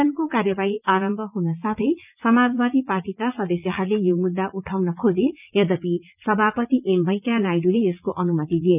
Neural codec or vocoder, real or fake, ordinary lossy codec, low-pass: vocoder, 44.1 kHz, 80 mel bands, Vocos; fake; none; 3.6 kHz